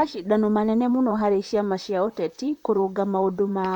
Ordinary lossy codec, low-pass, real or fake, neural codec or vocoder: none; 19.8 kHz; fake; vocoder, 44.1 kHz, 128 mel bands, Pupu-Vocoder